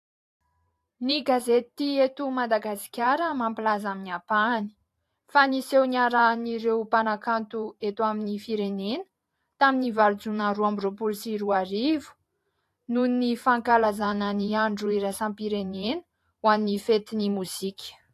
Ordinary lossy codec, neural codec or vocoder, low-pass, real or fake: AAC, 64 kbps; vocoder, 44.1 kHz, 128 mel bands every 512 samples, BigVGAN v2; 14.4 kHz; fake